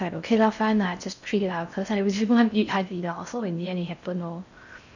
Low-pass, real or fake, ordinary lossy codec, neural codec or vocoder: 7.2 kHz; fake; none; codec, 16 kHz in and 24 kHz out, 0.6 kbps, FocalCodec, streaming, 4096 codes